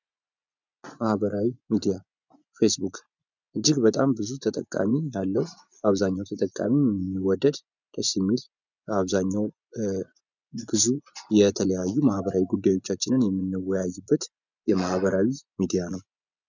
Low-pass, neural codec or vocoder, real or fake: 7.2 kHz; vocoder, 24 kHz, 100 mel bands, Vocos; fake